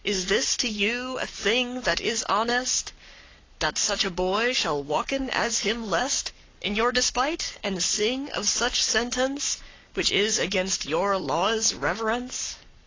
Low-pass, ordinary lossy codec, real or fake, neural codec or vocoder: 7.2 kHz; AAC, 32 kbps; fake; codec, 44.1 kHz, 7.8 kbps, Pupu-Codec